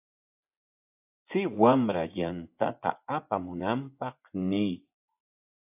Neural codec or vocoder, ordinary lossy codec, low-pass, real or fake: vocoder, 24 kHz, 100 mel bands, Vocos; AAC, 32 kbps; 3.6 kHz; fake